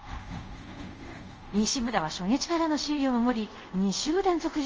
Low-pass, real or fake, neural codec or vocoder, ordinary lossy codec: 7.2 kHz; fake; codec, 24 kHz, 0.5 kbps, DualCodec; Opus, 24 kbps